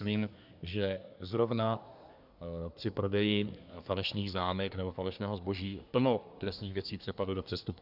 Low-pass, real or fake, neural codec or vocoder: 5.4 kHz; fake; codec, 24 kHz, 1 kbps, SNAC